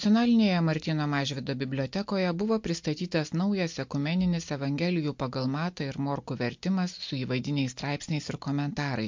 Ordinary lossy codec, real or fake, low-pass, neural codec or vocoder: MP3, 48 kbps; real; 7.2 kHz; none